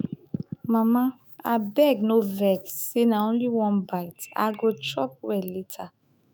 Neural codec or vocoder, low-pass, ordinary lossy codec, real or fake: autoencoder, 48 kHz, 128 numbers a frame, DAC-VAE, trained on Japanese speech; none; none; fake